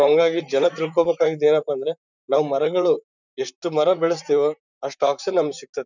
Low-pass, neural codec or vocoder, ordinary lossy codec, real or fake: 7.2 kHz; vocoder, 44.1 kHz, 128 mel bands, Pupu-Vocoder; none; fake